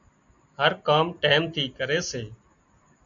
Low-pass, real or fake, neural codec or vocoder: 7.2 kHz; real; none